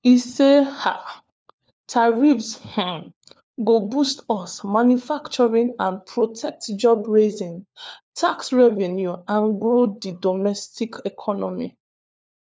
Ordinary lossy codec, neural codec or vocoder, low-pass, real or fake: none; codec, 16 kHz, 4 kbps, FunCodec, trained on LibriTTS, 50 frames a second; none; fake